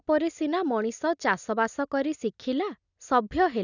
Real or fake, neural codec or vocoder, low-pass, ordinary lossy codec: real; none; 7.2 kHz; none